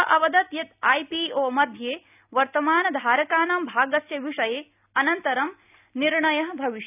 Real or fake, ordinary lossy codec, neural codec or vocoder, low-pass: real; none; none; 3.6 kHz